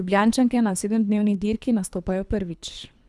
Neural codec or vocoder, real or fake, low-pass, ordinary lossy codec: codec, 24 kHz, 3 kbps, HILCodec; fake; none; none